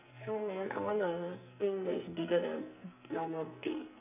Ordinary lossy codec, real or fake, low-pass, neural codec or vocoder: none; fake; 3.6 kHz; codec, 44.1 kHz, 2.6 kbps, SNAC